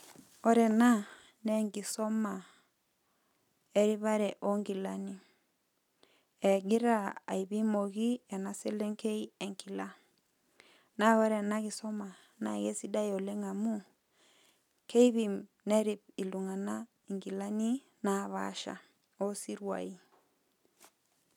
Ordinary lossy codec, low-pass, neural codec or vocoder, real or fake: none; 19.8 kHz; none; real